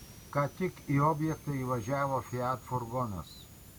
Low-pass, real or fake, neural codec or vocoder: 19.8 kHz; real; none